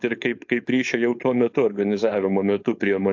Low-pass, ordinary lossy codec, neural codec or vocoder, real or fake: 7.2 kHz; AAC, 48 kbps; codec, 16 kHz, 4.8 kbps, FACodec; fake